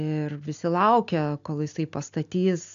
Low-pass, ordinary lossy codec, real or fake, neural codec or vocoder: 7.2 kHz; AAC, 64 kbps; real; none